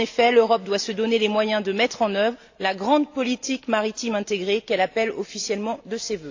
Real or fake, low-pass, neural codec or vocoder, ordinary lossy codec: real; 7.2 kHz; none; AAC, 48 kbps